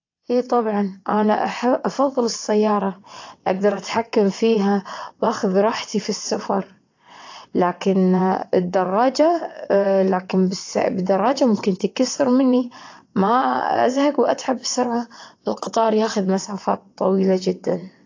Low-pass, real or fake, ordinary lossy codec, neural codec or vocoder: 7.2 kHz; fake; AAC, 48 kbps; vocoder, 22.05 kHz, 80 mel bands, WaveNeXt